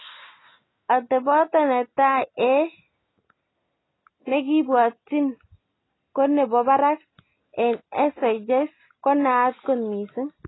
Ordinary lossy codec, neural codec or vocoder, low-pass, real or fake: AAC, 16 kbps; none; 7.2 kHz; real